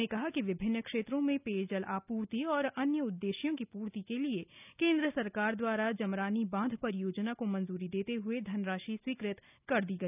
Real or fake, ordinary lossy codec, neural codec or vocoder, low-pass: real; none; none; 3.6 kHz